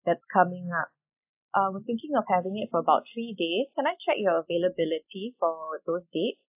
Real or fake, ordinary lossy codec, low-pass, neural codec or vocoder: real; AAC, 32 kbps; 3.6 kHz; none